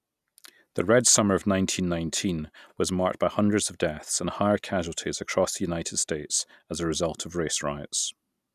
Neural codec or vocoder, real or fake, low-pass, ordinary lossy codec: none; real; 14.4 kHz; none